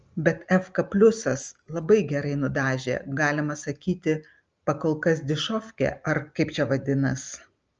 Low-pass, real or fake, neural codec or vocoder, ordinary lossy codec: 7.2 kHz; real; none; Opus, 24 kbps